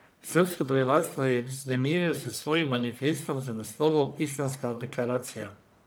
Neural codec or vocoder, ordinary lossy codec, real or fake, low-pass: codec, 44.1 kHz, 1.7 kbps, Pupu-Codec; none; fake; none